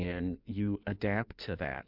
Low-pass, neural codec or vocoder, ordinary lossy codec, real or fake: 5.4 kHz; codec, 16 kHz in and 24 kHz out, 1.1 kbps, FireRedTTS-2 codec; MP3, 48 kbps; fake